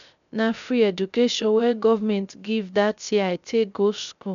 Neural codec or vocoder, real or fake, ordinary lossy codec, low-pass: codec, 16 kHz, 0.3 kbps, FocalCodec; fake; none; 7.2 kHz